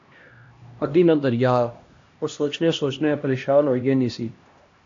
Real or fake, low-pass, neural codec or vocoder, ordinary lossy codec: fake; 7.2 kHz; codec, 16 kHz, 1 kbps, X-Codec, HuBERT features, trained on LibriSpeech; AAC, 48 kbps